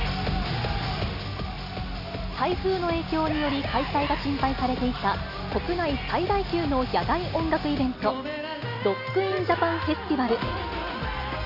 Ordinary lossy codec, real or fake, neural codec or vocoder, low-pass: none; real; none; 5.4 kHz